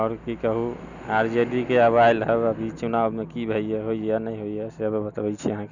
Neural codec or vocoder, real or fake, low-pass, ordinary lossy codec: none; real; 7.2 kHz; none